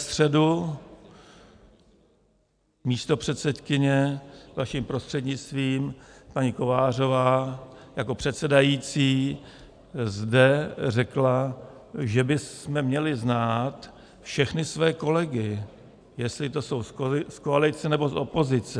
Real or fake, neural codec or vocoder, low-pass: real; none; 9.9 kHz